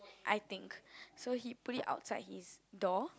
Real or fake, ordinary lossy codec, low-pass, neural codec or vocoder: real; none; none; none